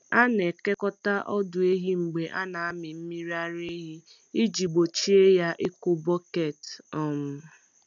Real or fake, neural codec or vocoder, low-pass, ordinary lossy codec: real; none; 7.2 kHz; none